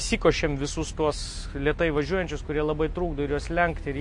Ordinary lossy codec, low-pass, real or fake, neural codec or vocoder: MP3, 48 kbps; 10.8 kHz; real; none